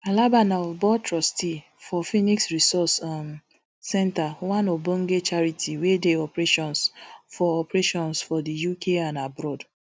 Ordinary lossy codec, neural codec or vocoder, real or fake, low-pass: none; none; real; none